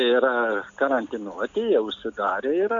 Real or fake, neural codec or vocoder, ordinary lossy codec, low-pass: real; none; AAC, 64 kbps; 7.2 kHz